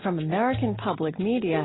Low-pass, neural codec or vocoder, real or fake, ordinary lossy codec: 7.2 kHz; none; real; AAC, 16 kbps